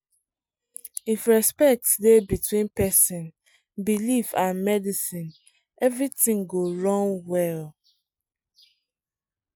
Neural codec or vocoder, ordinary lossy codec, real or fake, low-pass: none; none; real; none